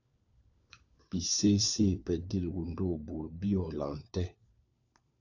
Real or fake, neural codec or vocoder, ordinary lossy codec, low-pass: fake; codec, 16 kHz, 4 kbps, FunCodec, trained on LibriTTS, 50 frames a second; AAC, 48 kbps; 7.2 kHz